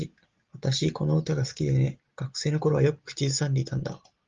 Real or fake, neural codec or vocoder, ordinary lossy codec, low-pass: real; none; Opus, 32 kbps; 7.2 kHz